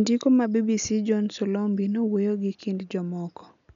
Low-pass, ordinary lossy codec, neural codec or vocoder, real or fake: 7.2 kHz; none; none; real